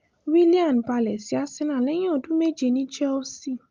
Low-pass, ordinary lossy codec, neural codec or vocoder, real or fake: 7.2 kHz; Opus, 32 kbps; none; real